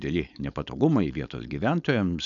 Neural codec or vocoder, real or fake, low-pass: codec, 16 kHz, 4.8 kbps, FACodec; fake; 7.2 kHz